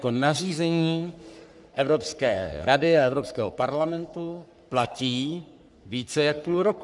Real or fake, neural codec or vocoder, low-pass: fake; codec, 44.1 kHz, 3.4 kbps, Pupu-Codec; 10.8 kHz